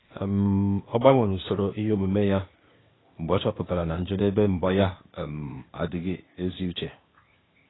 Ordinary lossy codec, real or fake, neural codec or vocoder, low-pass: AAC, 16 kbps; fake; codec, 16 kHz, 0.8 kbps, ZipCodec; 7.2 kHz